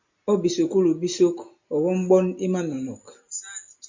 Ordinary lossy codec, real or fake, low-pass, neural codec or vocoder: MP3, 48 kbps; real; 7.2 kHz; none